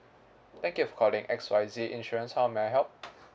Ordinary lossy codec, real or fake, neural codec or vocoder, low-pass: none; real; none; none